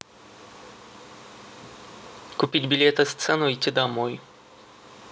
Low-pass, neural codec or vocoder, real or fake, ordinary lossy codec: none; none; real; none